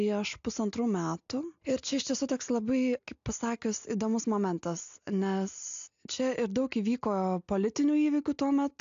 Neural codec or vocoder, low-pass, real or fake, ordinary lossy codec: none; 7.2 kHz; real; AAC, 64 kbps